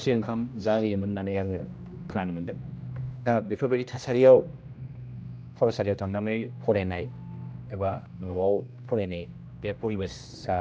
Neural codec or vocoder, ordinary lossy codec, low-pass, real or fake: codec, 16 kHz, 1 kbps, X-Codec, HuBERT features, trained on general audio; none; none; fake